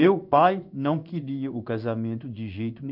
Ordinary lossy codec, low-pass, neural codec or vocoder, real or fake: none; 5.4 kHz; codec, 16 kHz in and 24 kHz out, 1 kbps, XY-Tokenizer; fake